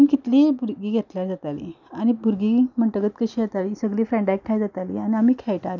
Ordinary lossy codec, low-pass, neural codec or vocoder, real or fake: none; 7.2 kHz; none; real